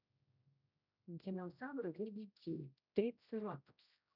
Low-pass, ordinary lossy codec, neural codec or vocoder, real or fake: 5.4 kHz; none; codec, 16 kHz, 0.5 kbps, X-Codec, HuBERT features, trained on general audio; fake